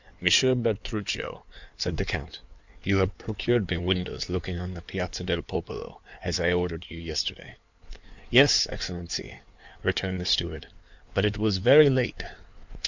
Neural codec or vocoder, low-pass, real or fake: codec, 16 kHz in and 24 kHz out, 2.2 kbps, FireRedTTS-2 codec; 7.2 kHz; fake